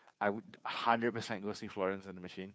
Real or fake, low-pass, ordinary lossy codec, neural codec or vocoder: fake; none; none; codec, 16 kHz, 2 kbps, FunCodec, trained on Chinese and English, 25 frames a second